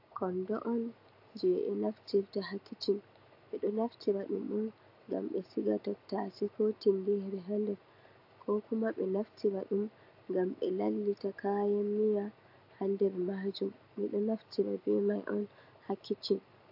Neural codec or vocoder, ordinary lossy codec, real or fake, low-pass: none; AAC, 48 kbps; real; 5.4 kHz